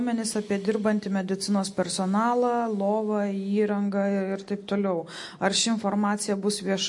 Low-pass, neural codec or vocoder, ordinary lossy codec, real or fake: 10.8 kHz; none; MP3, 48 kbps; real